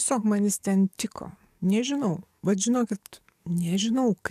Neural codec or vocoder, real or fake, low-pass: codec, 44.1 kHz, 7.8 kbps, DAC; fake; 14.4 kHz